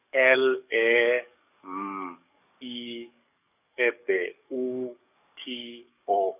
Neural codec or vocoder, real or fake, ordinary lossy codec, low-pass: codec, 44.1 kHz, 7.8 kbps, DAC; fake; none; 3.6 kHz